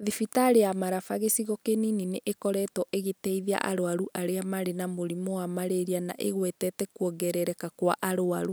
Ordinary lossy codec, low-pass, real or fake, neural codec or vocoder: none; none; real; none